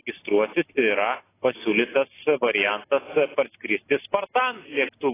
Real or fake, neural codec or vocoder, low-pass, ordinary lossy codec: real; none; 3.6 kHz; AAC, 16 kbps